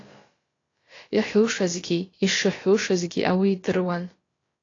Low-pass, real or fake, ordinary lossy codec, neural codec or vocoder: 7.2 kHz; fake; AAC, 32 kbps; codec, 16 kHz, about 1 kbps, DyCAST, with the encoder's durations